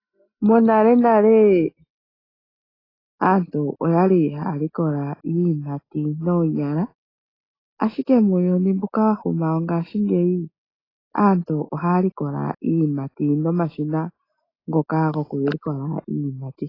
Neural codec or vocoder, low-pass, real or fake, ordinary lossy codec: none; 5.4 kHz; real; AAC, 24 kbps